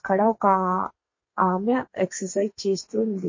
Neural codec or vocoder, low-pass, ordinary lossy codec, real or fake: codec, 32 kHz, 1.9 kbps, SNAC; 7.2 kHz; MP3, 32 kbps; fake